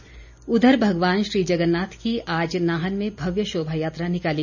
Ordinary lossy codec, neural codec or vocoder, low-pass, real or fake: none; none; 7.2 kHz; real